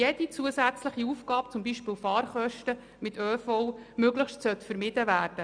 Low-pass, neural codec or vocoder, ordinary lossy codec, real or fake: 9.9 kHz; none; MP3, 96 kbps; real